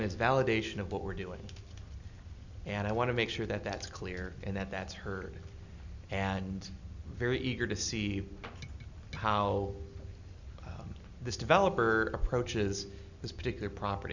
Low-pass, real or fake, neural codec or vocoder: 7.2 kHz; real; none